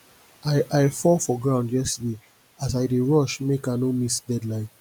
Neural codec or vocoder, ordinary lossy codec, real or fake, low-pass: none; none; real; none